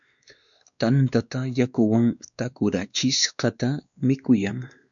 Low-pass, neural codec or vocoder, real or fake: 7.2 kHz; codec, 16 kHz, 2 kbps, X-Codec, WavLM features, trained on Multilingual LibriSpeech; fake